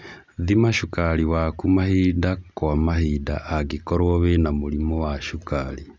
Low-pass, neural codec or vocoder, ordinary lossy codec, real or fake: none; none; none; real